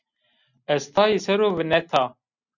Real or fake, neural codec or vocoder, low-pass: real; none; 7.2 kHz